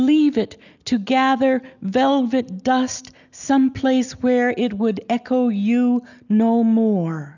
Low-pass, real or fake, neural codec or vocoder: 7.2 kHz; real; none